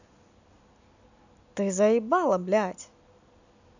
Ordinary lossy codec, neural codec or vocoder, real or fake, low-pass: none; none; real; 7.2 kHz